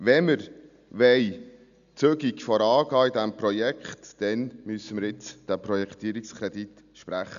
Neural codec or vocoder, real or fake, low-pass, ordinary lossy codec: none; real; 7.2 kHz; none